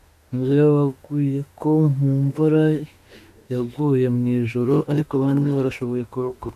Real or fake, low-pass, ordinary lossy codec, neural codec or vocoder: fake; 14.4 kHz; MP3, 96 kbps; autoencoder, 48 kHz, 32 numbers a frame, DAC-VAE, trained on Japanese speech